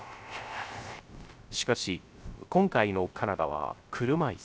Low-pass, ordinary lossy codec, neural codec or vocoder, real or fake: none; none; codec, 16 kHz, 0.3 kbps, FocalCodec; fake